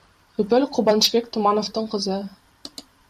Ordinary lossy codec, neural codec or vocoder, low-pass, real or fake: MP3, 96 kbps; vocoder, 44.1 kHz, 128 mel bands every 256 samples, BigVGAN v2; 14.4 kHz; fake